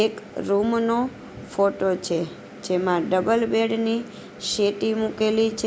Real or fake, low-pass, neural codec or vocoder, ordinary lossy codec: real; none; none; none